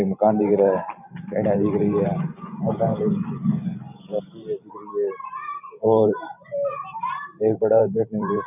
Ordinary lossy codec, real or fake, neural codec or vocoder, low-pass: none; fake; vocoder, 44.1 kHz, 128 mel bands every 512 samples, BigVGAN v2; 3.6 kHz